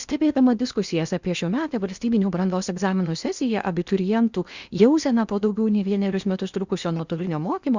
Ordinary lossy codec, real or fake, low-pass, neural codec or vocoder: Opus, 64 kbps; fake; 7.2 kHz; codec, 16 kHz in and 24 kHz out, 0.8 kbps, FocalCodec, streaming, 65536 codes